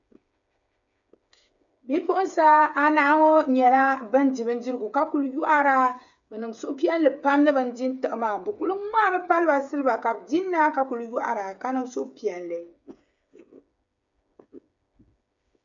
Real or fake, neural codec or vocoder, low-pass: fake; codec, 16 kHz, 8 kbps, FreqCodec, smaller model; 7.2 kHz